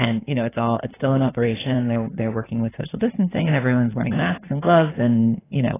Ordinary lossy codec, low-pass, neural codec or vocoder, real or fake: AAC, 16 kbps; 3.6 kHz; codec, 16 kHz in and 24 kHz out, 2.2 kbps, FireRedTTS-2 codec; fake